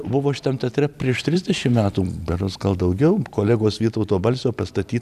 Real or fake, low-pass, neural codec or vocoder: fake; 14.4 kHz; vocoder, 44.1 kHz, 128 mel bands every 256 samples, BigVGAN v2